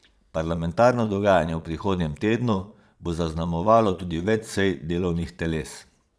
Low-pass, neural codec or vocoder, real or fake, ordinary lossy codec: none; vocoder, 22.05 kHz, 80 mel bands, Vocos; fake; none